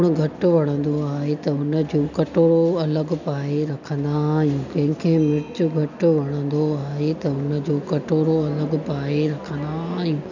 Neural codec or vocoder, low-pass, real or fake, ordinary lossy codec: none; 7.2 kHz; real; none